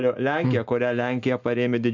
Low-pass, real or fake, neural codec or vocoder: 7.2 kHz; real; none